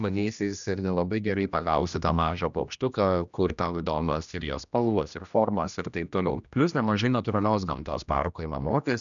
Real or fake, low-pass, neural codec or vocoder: fake; 7.2 kHz; codec, 16 kHz, 1 kbps, X-Codec, HuBERT features, trained on general audio